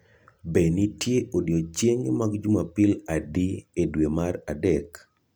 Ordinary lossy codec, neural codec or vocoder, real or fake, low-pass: none; none; real; none